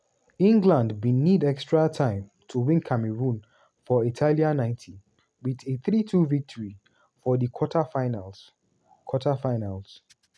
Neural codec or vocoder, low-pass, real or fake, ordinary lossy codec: none; none; real; none